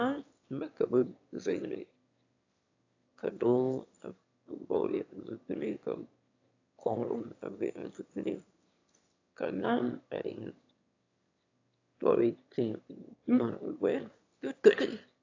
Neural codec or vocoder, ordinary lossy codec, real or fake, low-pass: autoencoder, 22.05 kHz, a latent of 192 numbers a frame, VITS, trained on one speaker; AAC, 48 kbps; fake; 7.2 kHz